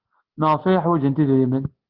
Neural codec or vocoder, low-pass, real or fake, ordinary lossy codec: none; 5.4 kHz; real; Opus, 16 kbps